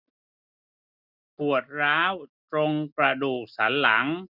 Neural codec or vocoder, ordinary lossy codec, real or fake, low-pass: none; none; real; 5.4 kHz